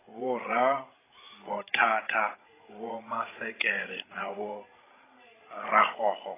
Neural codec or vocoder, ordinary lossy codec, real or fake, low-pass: codec, 16 kHz, 16 kbps, FreqCodec, larger model; AAC, 16 kbps; fake; 3.6 kHz